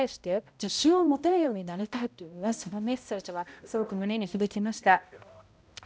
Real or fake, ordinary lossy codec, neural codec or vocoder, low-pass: fake; none; codec, 16 kHz, 0.5 kbps, X-Codec, HuBERT features, trained on balanced general audio; none